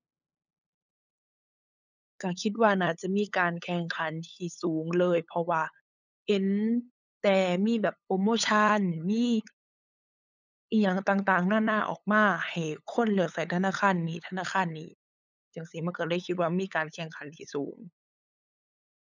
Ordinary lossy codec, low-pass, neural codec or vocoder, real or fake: none; 7.2 kHz; codec, 16 kHz, 8 kbps, FunCodec, trained on LibriTTS, 25 frames a second; fake